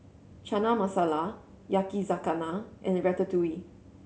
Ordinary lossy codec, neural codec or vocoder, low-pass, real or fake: none; none; none; real